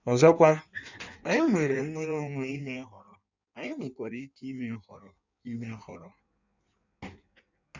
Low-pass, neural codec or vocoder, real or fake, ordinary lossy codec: 7.2 kHz; codec, 16 kHz in and 24 kHz out, 1.1 kbps, FireRedTTS-2 codec; fake; none